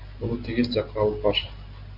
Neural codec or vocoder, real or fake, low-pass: none; real; 5.4 kHz